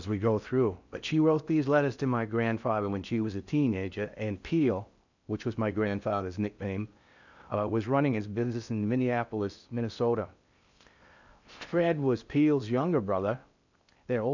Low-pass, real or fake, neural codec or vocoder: 7.2 kHz; fake; codec, 16 kHz in and 24 kHz out, 0.6 kbps, FocalCodec, streaming, 4096 codes